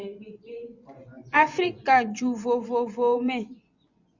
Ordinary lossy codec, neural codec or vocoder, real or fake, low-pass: Opus, 64 kbps; vocoder, 24 kHz, 100 mel bands, Vocos; fake; 7.2 kHz